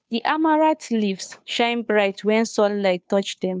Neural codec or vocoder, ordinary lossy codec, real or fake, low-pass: codec, 16 kHz, 2 kbps, FunCodec, trained on Chinese and English, 25 frames a second; none; fake; none